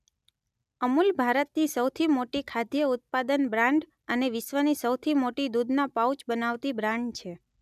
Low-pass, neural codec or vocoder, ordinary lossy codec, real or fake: 14.4 kHz; none; none; real